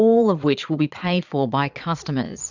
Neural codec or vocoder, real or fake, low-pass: vocoder, 22.05 kHz, 80 mel bands, Vocos; fake; 7.2 kHz